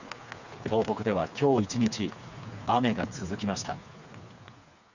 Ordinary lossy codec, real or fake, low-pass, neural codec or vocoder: none; fake; 7.2 kHz; codec, 16 kHz, 4 kbps, FreqCodec, smaller model